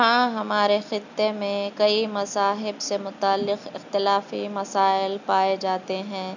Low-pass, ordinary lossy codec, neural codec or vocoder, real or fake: 7.2 kHz; none; none; real